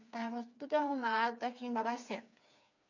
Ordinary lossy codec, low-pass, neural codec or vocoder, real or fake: none; 7.2 kHz; codec, 16 kHz, 4 kbps, FreqCodec, smaller model; fake